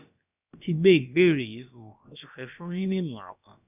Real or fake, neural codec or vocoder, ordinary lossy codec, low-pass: fake; codec, 16 kHz, about 1 kbps, DyCAST, with the encoder's durations; none; 3.6 kHz